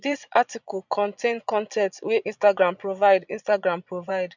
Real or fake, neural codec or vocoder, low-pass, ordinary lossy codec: fake; vocoder, 22.05 kHz, 80 mel bands, Vocos; 7.2 kHz; none